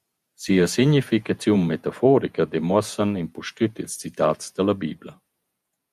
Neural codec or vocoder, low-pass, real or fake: vocoder, 48 kHz, 128 mel bands, Vocos; 14.4 kHz; fake